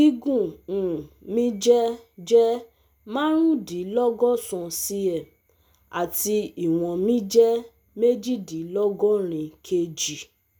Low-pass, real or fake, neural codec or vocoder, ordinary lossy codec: none; real; none; none